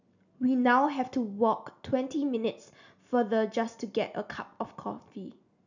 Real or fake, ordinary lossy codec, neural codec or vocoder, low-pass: real; none; none; 7.2 kHz